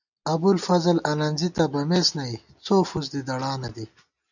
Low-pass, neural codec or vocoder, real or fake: 7.2 kHz; none; real